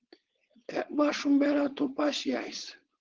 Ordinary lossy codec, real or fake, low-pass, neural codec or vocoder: Opus, 16 kbps; fake; 7.2 kHz; codec, 16 kHz, 4.8 kbps, FACodec